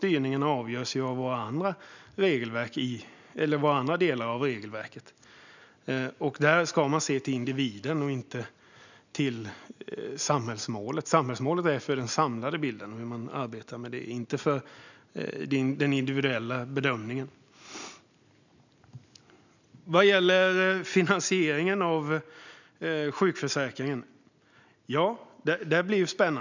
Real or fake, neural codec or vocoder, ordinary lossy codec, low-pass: real; none; none; 7.2 kHz